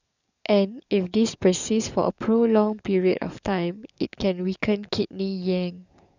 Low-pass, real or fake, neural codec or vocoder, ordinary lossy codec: 7.2 kHz; fake; codec, 44.1 kHz, 7.8 kbps, DAC; none